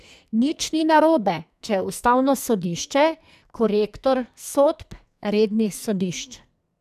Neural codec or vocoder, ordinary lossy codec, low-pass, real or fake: codec, 44.1 kHz, 2.6 kbps, SNAC; none; 14.4 kHz; fake